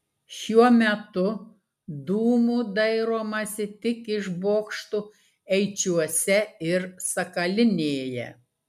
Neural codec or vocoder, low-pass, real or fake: none; 14.4 kHz; real